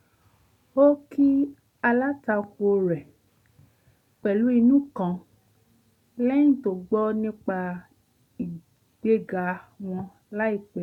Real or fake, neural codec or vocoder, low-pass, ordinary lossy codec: real; none; 19.8 kHz; none